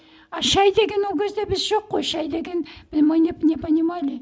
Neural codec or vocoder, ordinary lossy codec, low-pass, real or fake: none; none; none; real